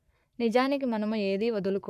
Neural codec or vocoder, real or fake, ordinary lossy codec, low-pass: codec, 44.1 kHz, 7.8 kbps, Pupu-Codec; fake; none; 14.4 kHz